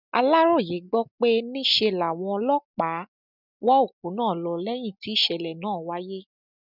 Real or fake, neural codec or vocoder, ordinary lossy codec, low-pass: real; none; none; 5.4 kHz